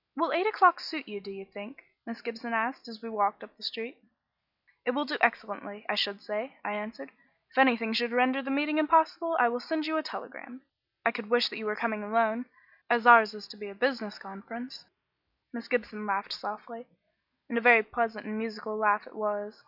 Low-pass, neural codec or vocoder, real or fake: 5.4 kHz; none; real